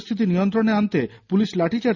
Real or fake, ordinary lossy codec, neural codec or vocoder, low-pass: real; none; none; 7.2 kHz